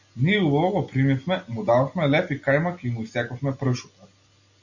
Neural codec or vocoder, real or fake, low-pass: none; real; 7.2 kHz